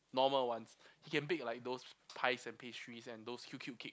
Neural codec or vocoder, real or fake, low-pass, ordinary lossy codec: none; real; none; none